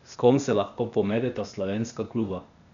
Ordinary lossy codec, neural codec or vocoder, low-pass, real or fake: none; codec, 16 kHz, 0.8 kbps, ZipCodec; 7.2 kHz; fake